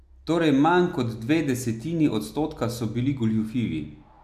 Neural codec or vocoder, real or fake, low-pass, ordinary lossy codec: none; real; 14.4 kHz; AAC, 96 kbps